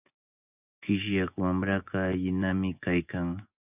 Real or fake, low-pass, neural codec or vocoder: fake; 3.6 kHz; vocoder, 24 kHz, 100 mel bands, Vocos